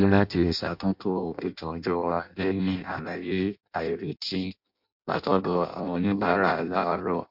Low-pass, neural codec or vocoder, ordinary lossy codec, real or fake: 5.4 kHz; codec, 16 kHz in and 24 kHz out, 0.6 kbps, FireRedTTS-2 codec; MP3, 48 kbps; fake